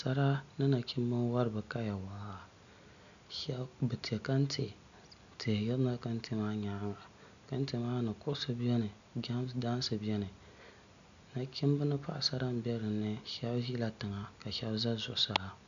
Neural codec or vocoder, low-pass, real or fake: none; 7.2 kHz; real